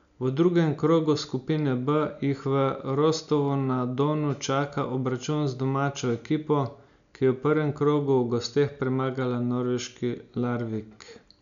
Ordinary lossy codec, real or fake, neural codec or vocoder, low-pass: none; real; none; 7.2 kHz